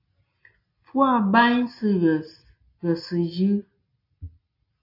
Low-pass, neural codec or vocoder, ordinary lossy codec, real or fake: 5.4 kHz; none; AAC, 32 kbps; real